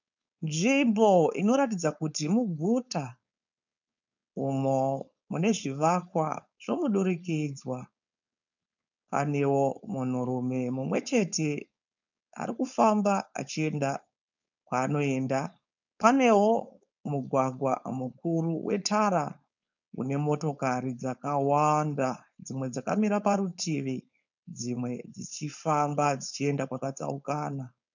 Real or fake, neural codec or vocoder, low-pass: fake; codec, 16 kHz, 4.8 kbps, FACodec; 7.2 kHz